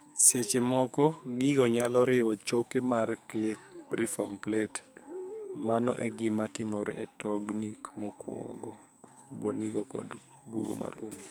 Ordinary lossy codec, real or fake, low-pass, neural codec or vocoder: none; fake; none; codec, 44.1 kHz, 2.6 kbps, SNAC